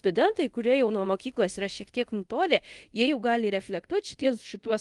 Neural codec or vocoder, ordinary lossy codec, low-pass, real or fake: codec, 24 kHz, 0.5 kbps, DualCodec; Opus, 24 kbps; 10.8 kHz; fake